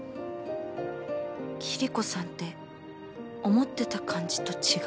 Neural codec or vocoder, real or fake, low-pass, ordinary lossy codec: none; real; none; none